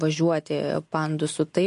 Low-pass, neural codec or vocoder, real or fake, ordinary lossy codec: 10.8 kHz; none; real; MP3, 48 kbps